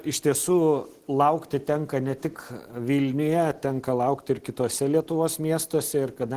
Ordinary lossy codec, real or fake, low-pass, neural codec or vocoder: Opus, 16 kbps; real; 14.4 kHz; none